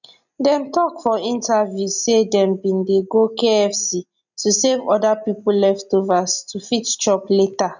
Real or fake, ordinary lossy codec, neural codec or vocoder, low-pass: real; none; none; 7.2 kHz